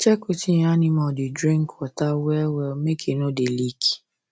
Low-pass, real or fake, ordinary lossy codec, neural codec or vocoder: none; real; none; none